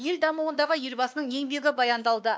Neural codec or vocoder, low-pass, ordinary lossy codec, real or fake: codec, 16 kHz, 2 kbps, X-Codec, WavLM features, trained on Multilingual LibriSpeech; none; none; fake